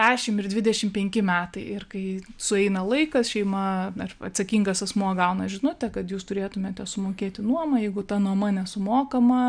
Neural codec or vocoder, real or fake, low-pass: none; real; 9.9 kHz